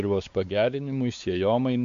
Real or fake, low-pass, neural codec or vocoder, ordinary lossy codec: fake; 7.2 kHz; codec, 16 kHz, 4 kbps, X-Codec, WavLM features, trained on Multilingual LibriSpeech; MP3, 64 kbps